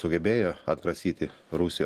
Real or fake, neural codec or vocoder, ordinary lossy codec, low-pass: real; none; Opus, 24 kbps; 14.4 kHz